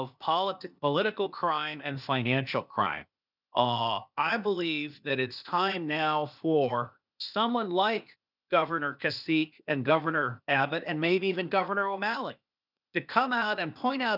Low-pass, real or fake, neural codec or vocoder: 5.4 kHz; fake; codec, 16 kHz, 0.8 kbps, ZipCodec